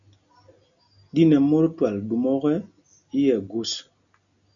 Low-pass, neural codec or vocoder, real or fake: 7.2 kHz; none; real